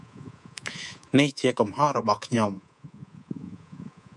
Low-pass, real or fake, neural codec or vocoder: 10.8 kHz; fake; codec, 24 kHz, 3.1 kbps, DualCodec